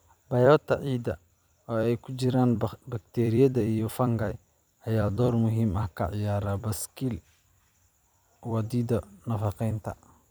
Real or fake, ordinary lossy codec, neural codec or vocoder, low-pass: fake; none; vocoder, 44.1 kHz, 128 mel bands every 256 samples, BigVGAN v2; none